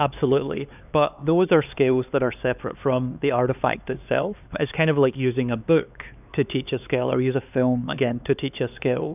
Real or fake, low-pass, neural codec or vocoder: fake; 3.6 kHz; codec, 16 kHz, 4 kbps, X-Codec, HuBERT features, trained on LibriSpeech